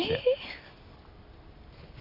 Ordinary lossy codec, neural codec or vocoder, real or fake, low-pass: none; none; real; 5.4 kHz